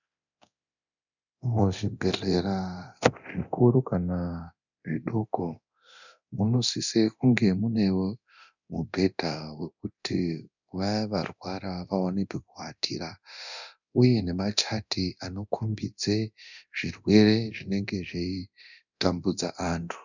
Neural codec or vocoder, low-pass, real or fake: codec, 24 kHz, 0.9 kbps, DualCodec; 7.2 kHz; fake